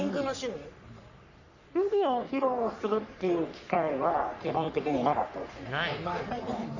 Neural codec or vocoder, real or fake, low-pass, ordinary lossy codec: codec, 44.1 kHz, 3.4 kbps, Pupu-Codec; fake; 7.2 kHz; none